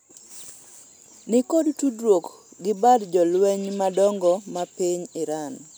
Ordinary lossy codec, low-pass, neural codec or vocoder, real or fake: none; none; none; real